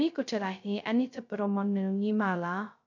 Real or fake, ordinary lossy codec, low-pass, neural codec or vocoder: fake; none; 7.2 kHz; codec, 16 kHz, 0.2 kbps, FocalCodec